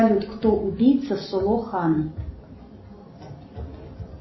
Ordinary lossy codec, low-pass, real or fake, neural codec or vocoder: MP3, 24 kbps; 7.2 kHz; real; none